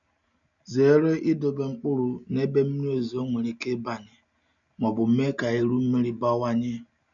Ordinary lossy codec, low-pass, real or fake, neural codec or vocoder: none; 7.2 kHz; real; none